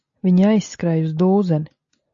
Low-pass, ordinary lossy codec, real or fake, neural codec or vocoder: 7.2 kHz; AAC, 64 kbps; real; none